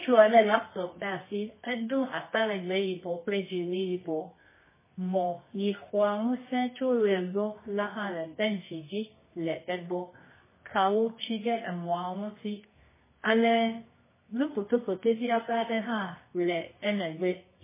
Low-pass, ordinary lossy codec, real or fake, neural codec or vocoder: 3.6 kHz; MP3, 16 kbps; fake; codec, 24 kHz, 0.9 kbps, WavTokenizer, medium music audio release